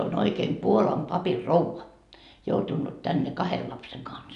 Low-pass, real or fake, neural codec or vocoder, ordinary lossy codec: 10.8 kHz; real; none; none